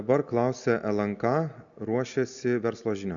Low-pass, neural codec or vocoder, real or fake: 7.2 kHz; none; real